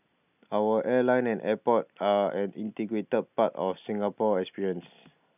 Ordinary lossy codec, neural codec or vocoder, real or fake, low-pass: none; none; real; 3.6 kHz